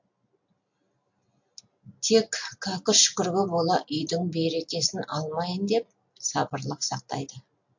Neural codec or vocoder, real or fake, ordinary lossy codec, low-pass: none; real; MP3, 48 kbps; 7.2 kHz